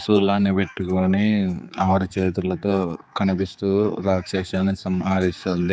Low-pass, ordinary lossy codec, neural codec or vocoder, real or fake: none; none; codec, 16 kHz, 4 kbps, X-Codec, HuBERT features, trained on general audio; fake